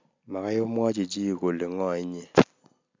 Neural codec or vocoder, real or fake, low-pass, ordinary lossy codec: none; real; 7.2 kHz; MP3, 64 kbps